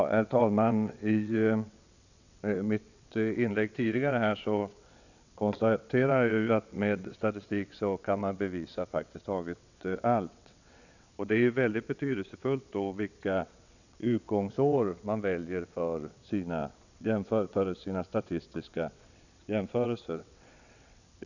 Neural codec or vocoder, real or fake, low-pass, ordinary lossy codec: vocoder, 22.05 kHz, 80 mel bands, WaveNeXt; fake; 7.2 kHz; none